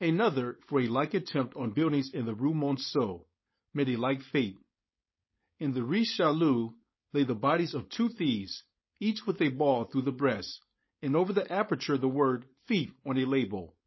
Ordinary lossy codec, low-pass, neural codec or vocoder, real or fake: MP3, 24 kbps; 7.2 kHz; codec, 16 kHz, 4.8 kbps, FACodec; fake